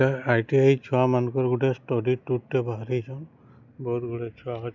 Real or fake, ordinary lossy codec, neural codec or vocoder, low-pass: real; none; none; 7.2 kHz